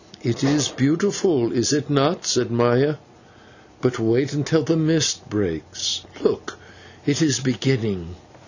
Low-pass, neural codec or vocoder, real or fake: 7.2 kHz; none; real